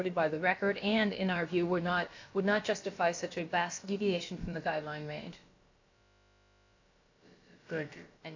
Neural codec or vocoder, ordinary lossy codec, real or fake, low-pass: codec, 16 kHz, about 1 kbps, DyCAST, with the encoder's durations; AAC, 48 kbps; fake; 7.2 kHz